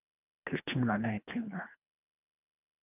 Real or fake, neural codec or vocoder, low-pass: fake; codec, 24 kHz, 1.5 kbps, HILCodec; 3.6 kHz